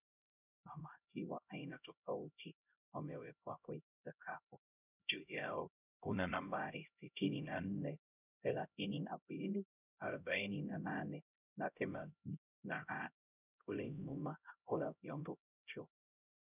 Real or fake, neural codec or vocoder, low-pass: fake; codec, 16 kHz, 0.5 kbps, X-Codec, HuBERT features, trained on LibriSpeech; 3.6 kHz